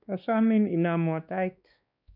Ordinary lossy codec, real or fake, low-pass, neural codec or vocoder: none; fake; 5.4 kHz; codec, 16 kHz, 2 kbps, X-Codec, WavLM features, trained on Multilingual LibriSpeech